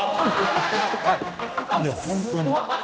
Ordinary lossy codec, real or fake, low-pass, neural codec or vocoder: none; fake; none; codec, 16 kHz, 1 kbps, X-Codec, HuBERT features, trained on general audio